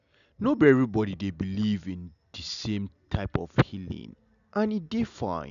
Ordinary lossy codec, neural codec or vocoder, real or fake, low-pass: none; none; real; 7.2 kHz